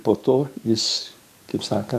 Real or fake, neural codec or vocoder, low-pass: fake; codec, 44.1 kHz, 7.8 kbps, DAC; 14.4 kHz